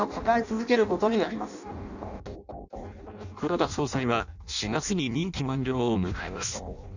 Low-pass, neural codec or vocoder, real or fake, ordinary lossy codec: 7.2 kHz; codec, 16 kHz in and 24 kHz out, 0.6 kbps, FireRedTTS-2 codec; fake; none